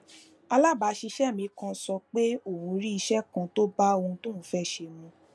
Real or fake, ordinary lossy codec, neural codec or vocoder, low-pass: real; none; none; none